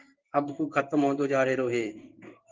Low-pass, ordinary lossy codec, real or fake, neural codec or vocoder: 7.2 kHz; Opus, 32 kbps; fake; codec, 16 kHz in and 24 kHz out, 1 kbps, XY-Tokenizer